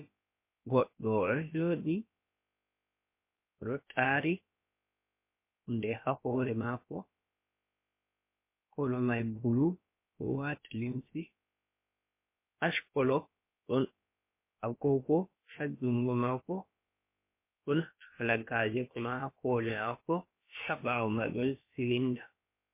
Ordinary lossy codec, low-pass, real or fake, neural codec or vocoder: MP3, 24 kbps; 3.6 kHz; fake; codec, 16 kHz, about 1 kbps, DyCAST, with the encoder's durations